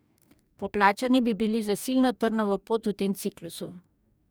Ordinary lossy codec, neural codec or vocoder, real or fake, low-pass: none; codec, 44.1 kHz, 2.6 kbps, DAC; fake; none